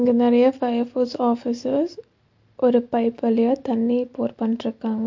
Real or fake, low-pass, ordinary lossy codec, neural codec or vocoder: real; 7.2 kHz; MP3, 48 kbps; none